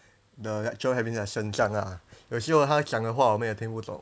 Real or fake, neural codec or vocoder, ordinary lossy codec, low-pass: real; none; none; none